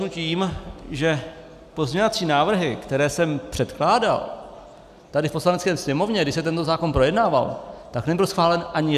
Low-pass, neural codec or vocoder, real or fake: 14.4 kHz; none; real